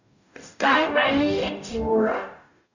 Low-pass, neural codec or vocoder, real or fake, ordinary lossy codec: 7.2 kHz; codec, 44.1 kHz, 0.9 kbps, DAC; fake; none